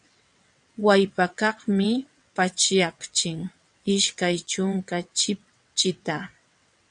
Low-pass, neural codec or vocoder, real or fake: 9.9 kHz; vocoder, 22.05 kHz, 80 mel bands, WaveNeXt; fake